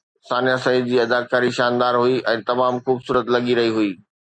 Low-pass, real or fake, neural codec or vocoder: 9.9 kHz; real; none